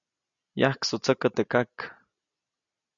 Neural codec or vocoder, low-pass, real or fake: none; 7.2 kHz; real